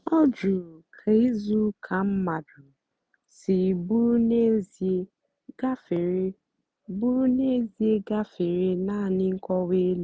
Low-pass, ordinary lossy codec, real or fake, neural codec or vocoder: none; none; real; none